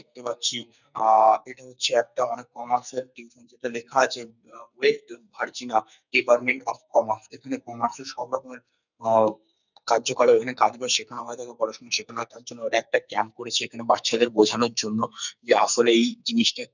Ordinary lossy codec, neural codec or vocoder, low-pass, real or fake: none; codec, 44.1 kHz, 2.6 kbps, SNAC; 7.2 kHz; fake